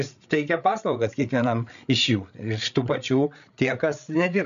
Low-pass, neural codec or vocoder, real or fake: 7.2 kHz; codec, 16 kHz, 8 kbps, FreqCodec, larger model; fake